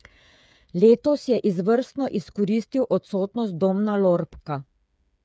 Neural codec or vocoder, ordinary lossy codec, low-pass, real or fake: codec, 16 kHz, 8 kbps, FreqCodec, smaller model; none; none; fake